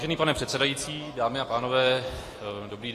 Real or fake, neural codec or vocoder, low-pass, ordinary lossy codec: real; none; 14.4 kHz; AAC, 48 kbps